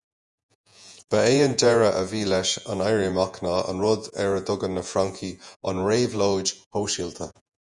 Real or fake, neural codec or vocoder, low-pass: fake; vocoder, 48 kHz, 128 mel bands, Vocos; 10.8 kHz